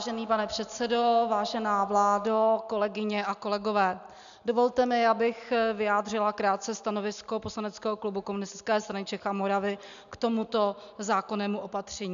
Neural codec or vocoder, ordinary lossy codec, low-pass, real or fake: none; AAC, 96 kbps; 7.2 kHz; real